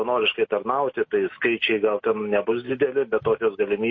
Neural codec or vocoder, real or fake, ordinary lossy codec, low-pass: none; real; MP3, 32 kbps; 7.2 kHz